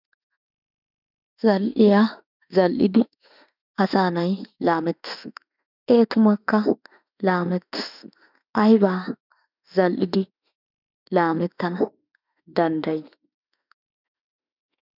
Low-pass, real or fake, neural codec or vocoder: 5.4 kHz; fake; autoencoder, 48 kHz, 32 numbers a frame, DAC-VAE, trained on Japanese speech